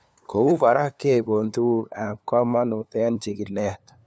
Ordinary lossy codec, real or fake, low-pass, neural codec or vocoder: none; fake; none; codec, 16 kHz, 2 kbps, FunCodec, trained on LibriTTS, 25 frames a second